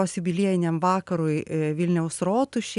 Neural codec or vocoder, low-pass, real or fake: none; 10.8 kHz; real